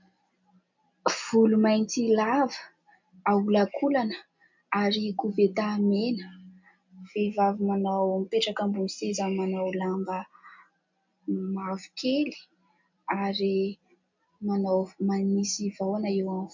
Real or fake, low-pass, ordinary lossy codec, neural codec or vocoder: real; 7.2 kHz; MP3, 64 kbps; none